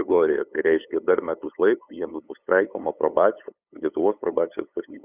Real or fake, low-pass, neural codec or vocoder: fake; 3.6 kHz; codec, 16 kHz, 8 kbps, FunCodec, trained on LibriTTS, 25 frames a second